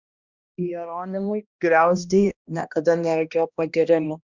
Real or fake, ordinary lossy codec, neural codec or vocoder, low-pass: fake; Opus, 64 kbps; codec, 16 kHz, 1 kbps, X-Codec, HuBERT features, trained on balanced general audio; 7.2 kHz